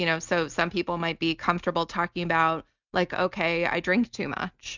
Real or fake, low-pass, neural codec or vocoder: real; 7.2 kHz; none